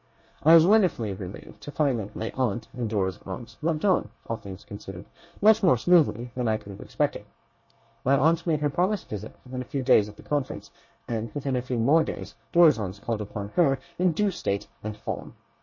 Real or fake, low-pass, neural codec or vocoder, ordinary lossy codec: fake; 7.2 kHz; codec, 24 kHz, 1 kbps, SNAC; MP3, 32 kbps